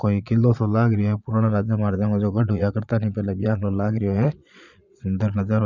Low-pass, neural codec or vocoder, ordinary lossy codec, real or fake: 7.2 kHz; vocoder, 22.05 kHz, 80 mel bands, Vocos; none; fake